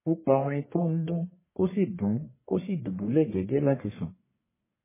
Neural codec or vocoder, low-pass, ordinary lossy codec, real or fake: codec, 44.1 kHz, 1.7 kbps, Pupu-Codec; 3.6 kHz; MP3, 16 kbps; fake